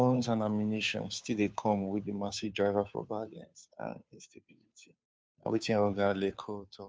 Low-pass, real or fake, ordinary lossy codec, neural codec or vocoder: none; fake; none; codec, 16 kHz, 2 kbps, FunCodec, trained on Chinese and English, 25 frames a second